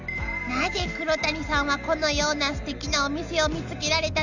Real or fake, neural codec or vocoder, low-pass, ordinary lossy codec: real; none; 7.2 kHz; none